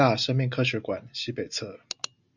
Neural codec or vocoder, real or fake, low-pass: none; real; 7.2 kHz